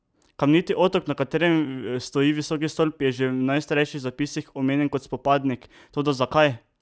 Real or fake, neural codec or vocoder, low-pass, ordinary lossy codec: real; none; none; none